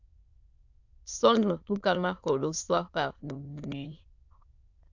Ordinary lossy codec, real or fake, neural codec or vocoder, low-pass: AAC, 48 kbps; fake; autoencoder, 22.05 kHz, a latent of 192 numbers a frame, VITS, trained on many speakers; 7.2 kHz